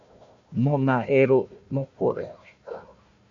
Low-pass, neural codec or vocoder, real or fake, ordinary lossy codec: 7.2 kHz; codec, 16 kHz, 1 kbps, FunCodec, trained on Chinese and English, 50 frames a second; fake; AAC, 64 kbps